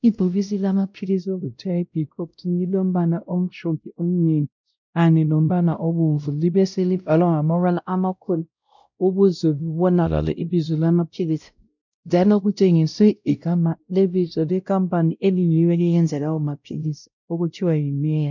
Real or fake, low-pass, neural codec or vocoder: fake; 7.2 kHz; codec, 16 kHz, 0.5 kbps, X-Codec, WavLM features, trained on Multilingual LibriSpeech